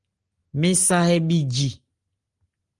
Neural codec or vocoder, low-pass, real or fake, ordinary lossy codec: none; 10.8 kHz; real; Opus, 24 kbps